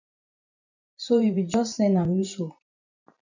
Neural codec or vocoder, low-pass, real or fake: vocoder, 44.1 kHz, 128 mel bands every 512 samples, BigVGAN v2; 7.2 kHz; fake